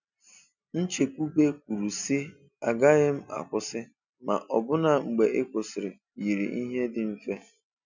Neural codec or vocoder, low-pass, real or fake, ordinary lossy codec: none; 7.2 kHz; real; none